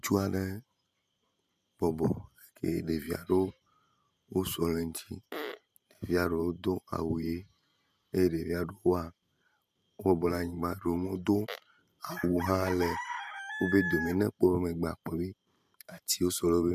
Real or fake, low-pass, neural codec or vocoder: fake; 14.4 kHz; vocoder, 44.1 kHz, 128 mel bands every 512 samples, BigVGAN v2